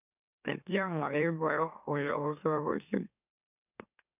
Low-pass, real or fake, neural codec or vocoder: 3.6 kHz; fake; autoencoder, 44.1 kHz, a latent of 192 numbers a frame, MeloTTS